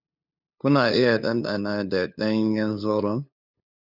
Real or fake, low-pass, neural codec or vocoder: fake; 5.4 kHz; codec, 16 kHz, 2 kbps, FunCodec, trained on LibriTTS, 25 frames a second